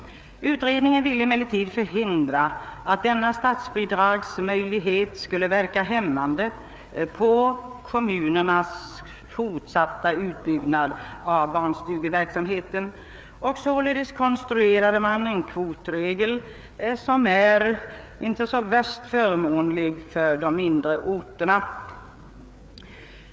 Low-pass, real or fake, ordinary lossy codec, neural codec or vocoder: none; fake; none; codec, 16 kHz, 4 kbps, FreqCodec, larger model